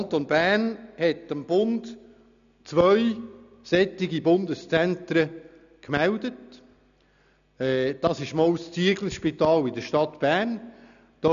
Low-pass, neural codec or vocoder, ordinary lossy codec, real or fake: 7.2 kHz; none; none; real